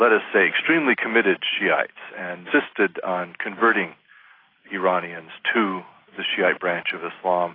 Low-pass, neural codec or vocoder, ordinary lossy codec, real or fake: 5.4 kHz; none; AAC, 24 kbps; real